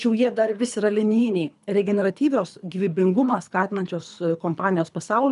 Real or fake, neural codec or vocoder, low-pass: fake; codec, 24 kHz, 3 kbps, HILCodec; 10.8 kHz